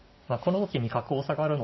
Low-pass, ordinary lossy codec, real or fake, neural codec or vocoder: 7.2 kHz; MP3, 24 kbps; real; none